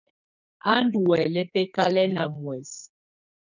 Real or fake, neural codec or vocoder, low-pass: fake; codec, 44.1 kHz, 2.6 kbps, SNAC; 7.2 kHz